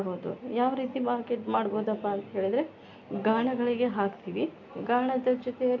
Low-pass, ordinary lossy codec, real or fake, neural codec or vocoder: 7.2 kHz; none; real; none